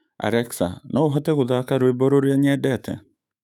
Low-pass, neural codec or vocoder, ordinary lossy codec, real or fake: 19.8 kHz; autoencoder, 48 kHz, 128 numbers a frame, DAC-VAE, trained on Japanese speech; none; fake